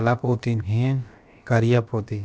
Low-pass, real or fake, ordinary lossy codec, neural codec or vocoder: none; fake; none; codec, 16 kHz, about 1 kbps, DyCAST, with the encoder's durations